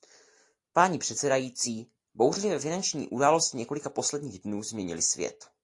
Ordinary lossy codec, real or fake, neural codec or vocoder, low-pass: AAC, 48 kbps; real; none; 10.8 kHz